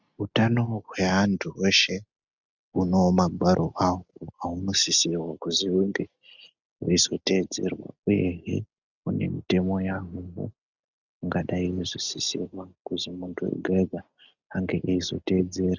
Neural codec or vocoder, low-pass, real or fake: none; 7.2 kHz; real